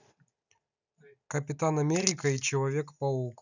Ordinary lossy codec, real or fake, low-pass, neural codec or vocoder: none; real; 7.2 kHz; none